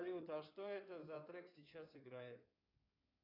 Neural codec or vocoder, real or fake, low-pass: codec, 16 kHz in and 24 kHz out, 2.2 kbps, FireRedTTS-2 codec; fake; 5.4 kHz